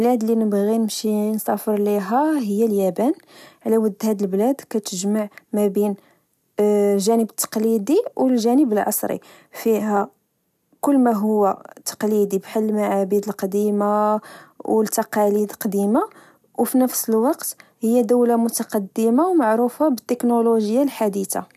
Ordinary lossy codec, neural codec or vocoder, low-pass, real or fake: none; none; 14.4 kHz; real